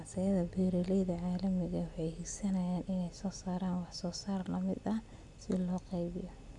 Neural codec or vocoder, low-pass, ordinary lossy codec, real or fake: none; 10.8 kHz; none; real